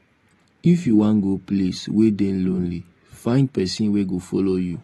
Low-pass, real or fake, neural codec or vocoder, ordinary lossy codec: 19.8 kHz; real; none; AAC, 32 kbps